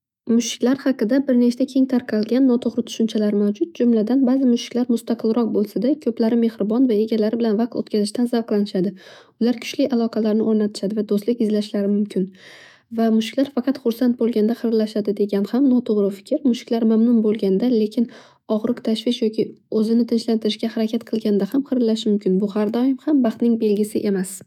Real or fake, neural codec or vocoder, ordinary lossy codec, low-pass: fake; autoencoder, 48 kHz, 128 numbers a frame, DAC-VAE, trained on Japanese speech; none; 19.8 kHz